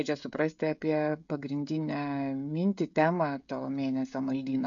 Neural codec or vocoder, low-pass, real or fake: codec, 16 kHz, 16 kbps, FreqCodec, smaller model; 7.2 kHz; fake